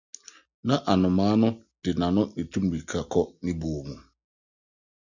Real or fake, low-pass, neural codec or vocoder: real; 7.2 kHz; none